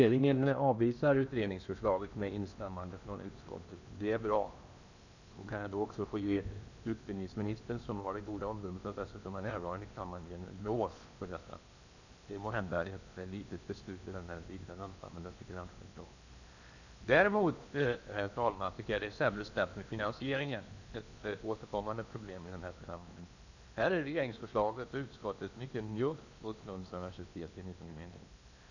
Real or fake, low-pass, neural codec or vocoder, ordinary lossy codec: fake; 7.2 kHz; codec, 16 kHz in and 24 kHz out, 0.8 kbps, FocalCodec, streaming, 65536 codes; none